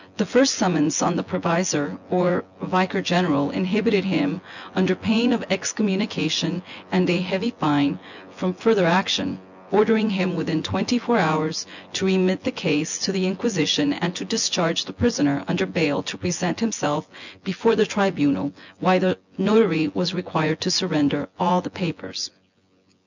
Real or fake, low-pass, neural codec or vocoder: fake; 7.2 kHz; vocoder, 24 kHz, 100 mel bands, Vocos